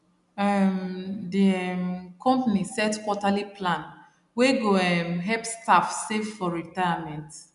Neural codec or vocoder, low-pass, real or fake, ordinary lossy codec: none; 10.8 kHz; real; none